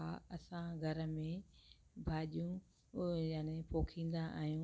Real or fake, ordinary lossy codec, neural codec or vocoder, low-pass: real; none; none; none